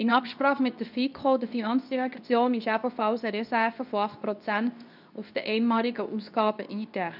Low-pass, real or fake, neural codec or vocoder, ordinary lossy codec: 5.4 kHz; fake; codec, 24 kHz, 0.9 kbps, WavTokenizer, medium speech release version 2; none